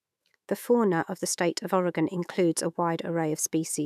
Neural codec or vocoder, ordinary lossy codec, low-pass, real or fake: autoencoder, 48 kHz, 128 numbers a frame, DAC-VAE, trained on Japanese speech; none; 14.4 kHz; fake